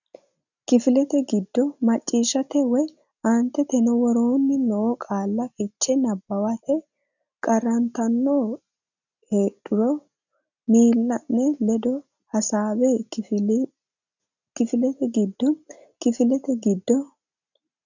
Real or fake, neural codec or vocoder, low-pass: real; none; 7.2 kHz